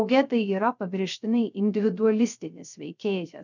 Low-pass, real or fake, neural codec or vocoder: 7.2 kHz; fake; codec, 16 kHz, 0.3 kbps, FocalCodec